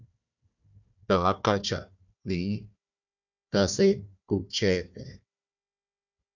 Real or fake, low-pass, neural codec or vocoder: fake; 7.2 kHz; codec, 16 kHz, 1 kbps, FunCodec, trained on Chinese and English, 50 frames a second